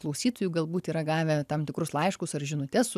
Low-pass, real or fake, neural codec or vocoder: 14.4 kHz; real; none